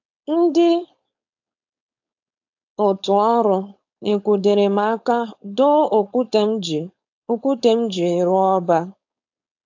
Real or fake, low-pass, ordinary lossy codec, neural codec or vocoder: fake; 7.2 kHz; AAC, 48 kbps; codec, 16 kHz, 4.8 kbps, FACodec